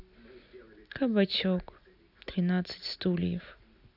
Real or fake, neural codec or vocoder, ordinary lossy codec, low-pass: real; none; none; 5.4 kHz